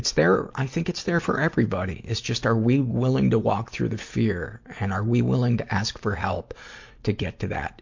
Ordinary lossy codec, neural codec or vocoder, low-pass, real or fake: MP3, 48 kbps; none; 7.2 kHz; real